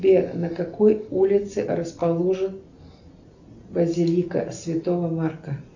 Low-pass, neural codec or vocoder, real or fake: 7.2 kHz; none; real